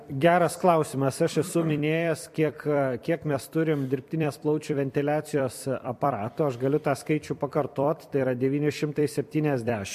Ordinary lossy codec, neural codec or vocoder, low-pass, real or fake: MP3, 64 kbps; vocoder, 44.1 kHz, 128 mel bands every 256 samples, BigVGAN v2; 14.4 kHz; fake